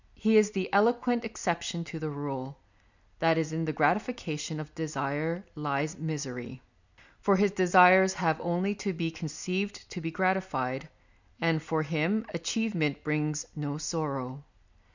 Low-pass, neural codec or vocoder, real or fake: 7.2 kHz; none; real